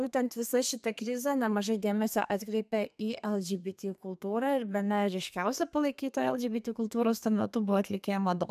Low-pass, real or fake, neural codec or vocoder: 14.4 kHz; fake; codec, 32 kHz, 1.9 kbps, SNAC